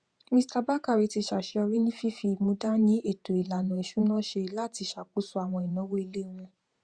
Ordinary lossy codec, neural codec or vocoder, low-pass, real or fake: Opus, 64 kbps; vocoder, 48 kHz, 128 mel bands, Vocos; 9.9 kHz; fake